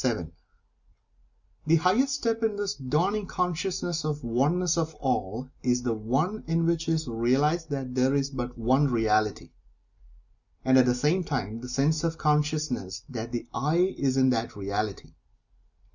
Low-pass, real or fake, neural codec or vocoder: 7.2 kHz; real; none